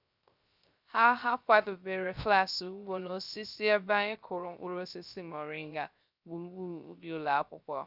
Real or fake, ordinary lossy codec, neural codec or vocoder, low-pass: fake; none; codec, 16 kHz, 0.3 kbps, FocalCodec; 5.4 kHz